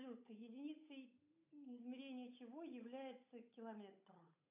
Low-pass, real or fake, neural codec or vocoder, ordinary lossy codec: 3.6 kHz; fake; codec, 16 kHz, 8 kbps, FunCodec, trained on Chinese and English, 25 frames a second; AAC, 32 kbps